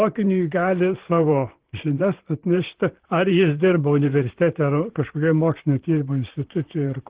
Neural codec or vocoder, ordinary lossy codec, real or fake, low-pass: vocoder, 44.1 kHz, 128 mel bands, Pupu-Vocoder; Opus, 16 kbps; fake; 3.6 kHz